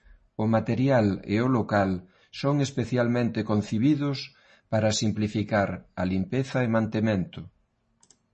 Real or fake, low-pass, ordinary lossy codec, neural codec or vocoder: real; 10.8 kHz; MP3, 32 kbps; none